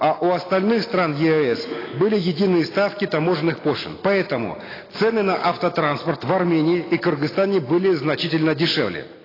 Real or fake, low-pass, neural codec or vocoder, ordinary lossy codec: real; 5.4 kHz; none; AAC, 24 kbps